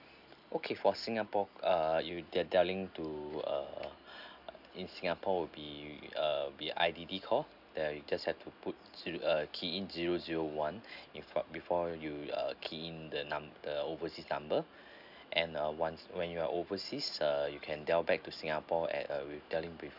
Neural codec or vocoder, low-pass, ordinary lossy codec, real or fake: none; 5.4 kHz; none; real